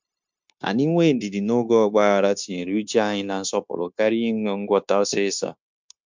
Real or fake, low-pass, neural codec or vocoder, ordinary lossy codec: fake; 7.2 kHz; codec, 16 kHz, 0.9 kbps, LongCat-Audio-Codec; MP3, 64 kbps